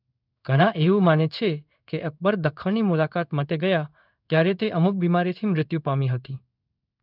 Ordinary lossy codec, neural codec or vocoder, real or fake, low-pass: none; codec, 16 kHz in and 24 kHz out, 1 kbps, XY-Tokenizer; fake; 5.4 kHz